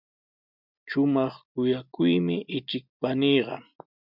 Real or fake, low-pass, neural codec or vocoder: real; 5.4 kHz; none